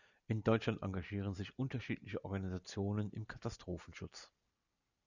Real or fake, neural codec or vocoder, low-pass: real; none; 7.2 kHz